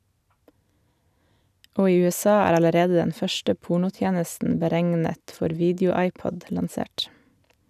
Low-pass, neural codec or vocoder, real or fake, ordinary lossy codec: 14.4 kHz; none; real; none